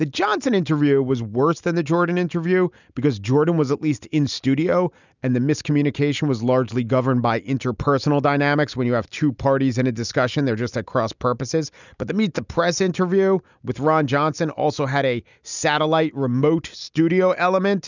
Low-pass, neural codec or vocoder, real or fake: 7.2 kHz; none; real